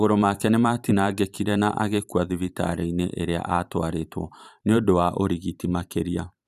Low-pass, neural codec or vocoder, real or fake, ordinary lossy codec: 14.4 kHz; vocoder, 44.1 kHz, 128 mel bands every 512 samples, BigVGAN v2; fake; none